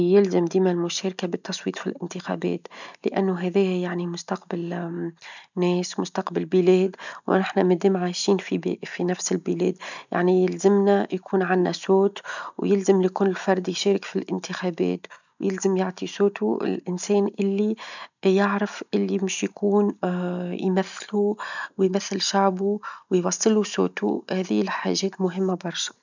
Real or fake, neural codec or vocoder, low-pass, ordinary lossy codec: real; none; 7.2 kHz; none